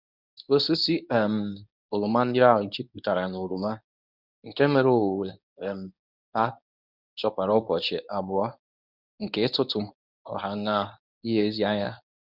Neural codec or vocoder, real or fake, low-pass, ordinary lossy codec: codec, 24 kHz, 0.9 kbps, WavTokenizer, medium speech release version 1; fake; 5.4 kHz; none